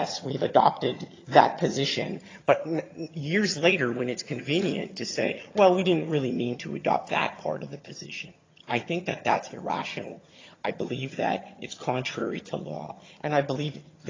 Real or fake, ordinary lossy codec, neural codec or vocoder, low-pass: fake; AAC, 32 kbps; vocoder, 22.05 kHz, 80 mel bands, HiFi-GAN; 7.2 kHz